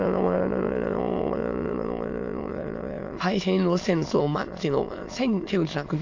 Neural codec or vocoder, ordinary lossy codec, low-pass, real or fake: autoencoder, 22.05 kHz, a latent of 192 numbers a frame, VITS, trained on many speakers; AAC, 48 kbps; 7.2 kHz; fake